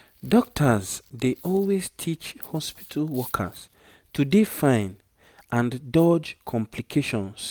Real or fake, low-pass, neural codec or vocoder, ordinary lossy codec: real; none; none; none